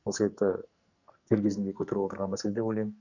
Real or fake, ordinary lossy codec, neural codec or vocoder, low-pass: fake; none; codec, 44.1 kHz, 2.6 kbps, SNAC; 7.2 kHz